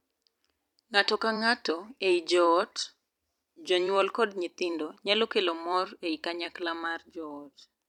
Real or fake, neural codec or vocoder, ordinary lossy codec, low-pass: fake; vocoder, 44.1 kHz, 128 mel bands every 256 samples, BigVGAN v2; none; 19.8 kHz